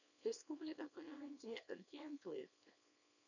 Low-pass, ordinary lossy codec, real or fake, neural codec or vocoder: 7.2 kHz; none; fake; codec, 24 kHz, 0.9 kbps, WavTokenizer, small release